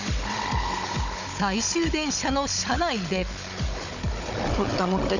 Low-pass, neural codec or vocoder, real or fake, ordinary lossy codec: 7.2 kHz; codec, 16 kHz, 16 kbps, FunCodec, trained on Chinese and English, 50 frames a second; fake; none